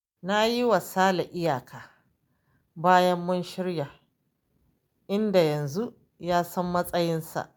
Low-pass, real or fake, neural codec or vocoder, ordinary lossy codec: none; real; none; none